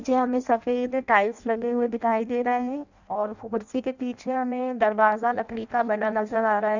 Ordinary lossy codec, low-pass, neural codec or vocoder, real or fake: none; 7.2 kHz; codec, 16 kHz in and 24 kHz out, 0.6 kbps, FireRedTTS-2 codec; fake